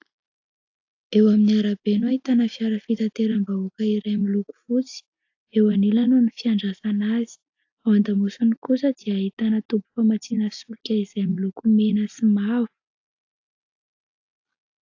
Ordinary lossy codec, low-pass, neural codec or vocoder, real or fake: AAC, 48 kbps; 7.2 kHz; none; real